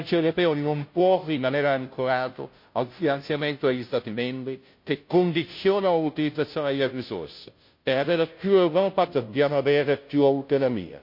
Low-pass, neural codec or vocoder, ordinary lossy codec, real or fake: 5.4 kHz; codec, 16 kHz, 0.5 kbps, FunCodec, trained on Chinese and English, 25 frames a second; MP3, 32 kbps; fake